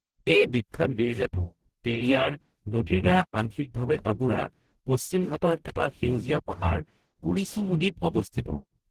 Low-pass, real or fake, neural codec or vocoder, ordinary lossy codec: 14.4 kHz; fake; codec, 44.1 kHz, 0.9 kbps, DAC; Opus, 16 kbps